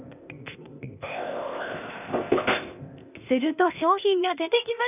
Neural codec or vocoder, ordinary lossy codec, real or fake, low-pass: codec, 16 kHz, 0.8 kbps, ZipCodec; none; fake; 3.6 kHz